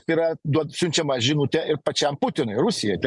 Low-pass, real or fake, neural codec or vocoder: 10.8 kHz; real; none